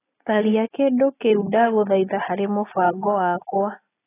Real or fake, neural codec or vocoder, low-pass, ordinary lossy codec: real; none; 3.6 kHz; AAC, 16 kbps